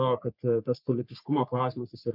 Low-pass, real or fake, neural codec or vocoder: 5.4 kHz; fake; codec, 44.1 kHz, 3.4 kbps, Pupu-Codec